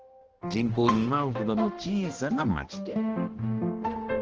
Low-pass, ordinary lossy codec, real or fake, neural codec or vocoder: 7.2 kHz; Opus, 16 kbps; fake; codec, 16 kHz, 1 kbps, X-Codec, HuBERT features, trained on balanced general audio